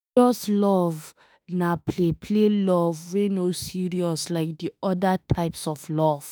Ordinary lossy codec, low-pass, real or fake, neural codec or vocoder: none; none; fake; autoencoder, 48 kHz, 32 numbers a frame, DAC-VAE, trained on Japanese speech